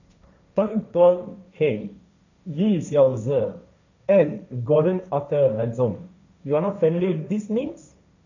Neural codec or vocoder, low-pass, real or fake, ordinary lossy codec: codec, 16 kHz, 1.1 kbps, Voila-Tokenizer; 7.2 kHz; fake; none